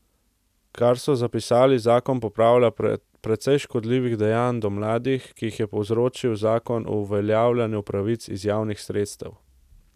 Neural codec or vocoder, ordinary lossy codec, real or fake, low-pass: none; none; real; 14.4 kHz